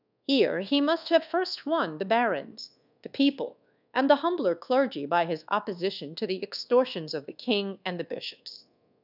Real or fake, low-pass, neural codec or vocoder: fake; 5.4 kHz; codec, 24 kHz, 1.2 kbps, DualCodec